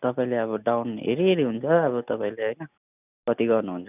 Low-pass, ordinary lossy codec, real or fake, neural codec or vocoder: 3.6 kHz; none; real; none